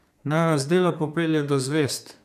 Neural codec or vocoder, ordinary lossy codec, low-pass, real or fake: codec, 32 kHz, 1.9 kbps, SNAC; none; 14.4 kHz; fake